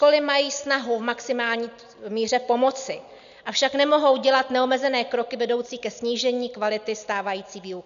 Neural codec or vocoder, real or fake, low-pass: none; real; 7.2 kHz